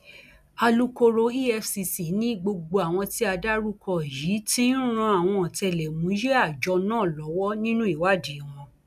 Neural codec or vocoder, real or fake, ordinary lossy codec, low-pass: none; real; none; 14.4 kHz